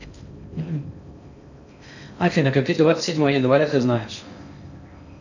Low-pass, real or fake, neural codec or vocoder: 7.2 kHz; fake; codec, 16 kHz in and 24 kHz out, 0.6 kbps, FocalCodec, streaming, 2048 codes